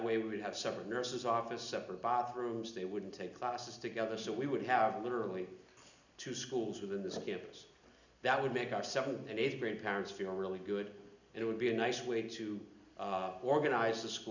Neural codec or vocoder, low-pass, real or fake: none; 7.2 kHz; real